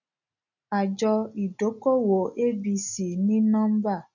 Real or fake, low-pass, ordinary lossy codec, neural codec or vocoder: real; 7.2 kHz; none; none